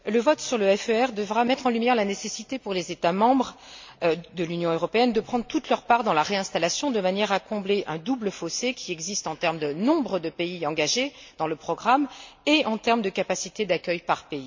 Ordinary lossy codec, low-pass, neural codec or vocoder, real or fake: MP3, 64 kbps; 7.2 kHz; none; real